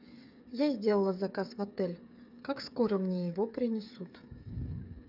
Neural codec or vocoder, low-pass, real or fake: codec, 16 kHz, 8 kbps, FreqCodec, smaller model; 5.4 kHz; fake